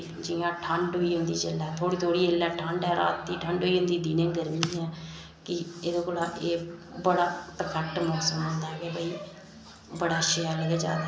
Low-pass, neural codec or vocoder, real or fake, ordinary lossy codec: none; none; real; none